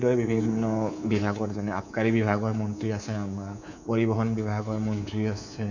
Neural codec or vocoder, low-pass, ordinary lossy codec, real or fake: codec, 24 kHz, 3.1 kbps, DualCodec; 7.2 kHz; none; fake